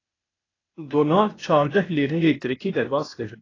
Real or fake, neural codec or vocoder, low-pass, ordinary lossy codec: fake; codec, 16 kHz, 0.8 kbps, ZipCodec; 7.2 kHz; AAC, 32 kbps